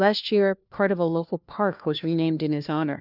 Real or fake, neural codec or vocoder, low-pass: fake; codec, 16 kHz, 1 kbps, FunCodec, trained on LibriTTS, 50 frames a second; 5.4 kHz